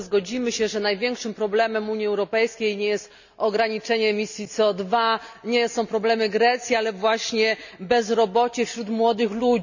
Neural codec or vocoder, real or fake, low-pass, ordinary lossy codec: none; real; 7.2 kHz; none